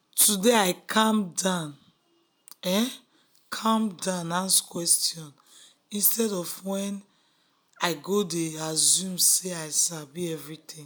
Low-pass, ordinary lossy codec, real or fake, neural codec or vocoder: none; none; real; none